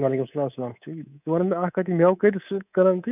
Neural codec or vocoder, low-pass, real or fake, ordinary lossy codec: codec, 24 kHz, 3.1 kbps, DualCodec; 3.6 kHz; fake; none